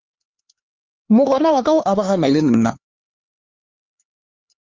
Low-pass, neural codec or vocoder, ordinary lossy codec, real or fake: 7.2 kHz; codec, 16 kHz, 4 kbps, X-Codec, HuBERT features, trained on LibriSpeech; Opus, 32 kbps; fake